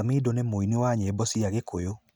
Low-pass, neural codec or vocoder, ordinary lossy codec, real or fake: none; none; none; real